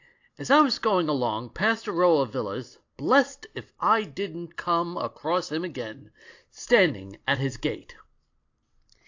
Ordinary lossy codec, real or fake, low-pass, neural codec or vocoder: AAC, 48 kbps; real; 7.2 kHz; none